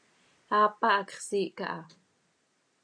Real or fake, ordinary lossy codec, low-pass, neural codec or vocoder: real; AAC, 64 kbps; 9.9 kHz; none